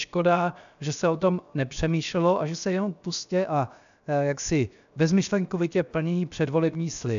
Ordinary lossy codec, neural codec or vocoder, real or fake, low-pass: AAC, 96 kbps; codec, 16 kHz, 0.7 kbps, FocalCodec; fake; 7.2 kHz